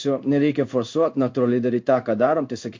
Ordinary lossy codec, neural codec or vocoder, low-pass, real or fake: MP3, 48 kbps; codec, 16 kHz in and 24 kHz out, 1 kbps, XY-Tokenizer; 7.2 kHz; fake